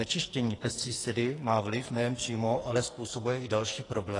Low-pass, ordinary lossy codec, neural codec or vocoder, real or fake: 10.8 kHz; AAC, 32 kbps; codec, 44.1 kHz, 2.6 kbps, SNAC; fake